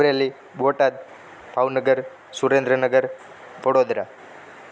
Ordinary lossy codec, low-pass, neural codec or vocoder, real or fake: none; none; none; real